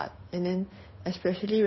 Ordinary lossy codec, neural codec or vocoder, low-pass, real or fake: MP3, 24 kbps; codec, 16 kHz, 8 kbps, FunCodec, trained on Chinese and English, 25 frames a second; 7.2 kHz; fake